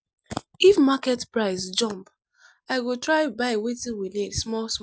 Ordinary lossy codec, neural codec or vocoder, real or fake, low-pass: none; none; real; none